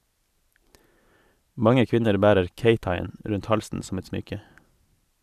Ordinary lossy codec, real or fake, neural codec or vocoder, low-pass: none; real; none; 14.4 kHz